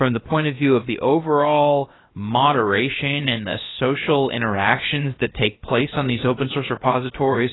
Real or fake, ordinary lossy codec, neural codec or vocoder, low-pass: fake; AAC, 16 kbps; codec, 16 kHz, about 1 kbps, DyCAST, with the encoder's durations; 7.2 kHz